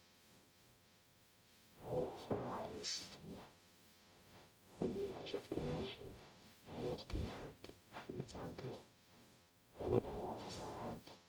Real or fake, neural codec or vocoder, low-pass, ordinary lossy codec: fake; codec, 44.1 kHz, 0.9 kbps, DAC; none; none